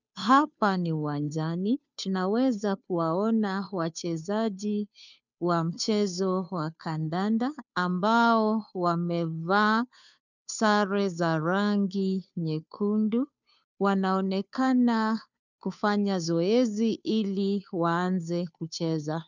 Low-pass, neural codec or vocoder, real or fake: 7.2 kHz; codec, 16 kHz, 2 kbps, FunCodec, trained on Chinese and English, 25 frames a second; fake